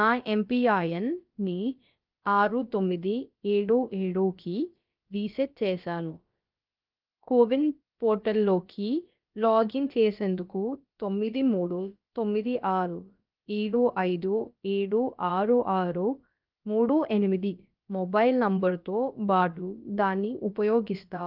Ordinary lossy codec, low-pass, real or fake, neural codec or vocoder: Opus, 24 kbps; 5.4 kHz; fake; codec, 16 kHz, about 1 kbps, DyCAST, with the encoder's durations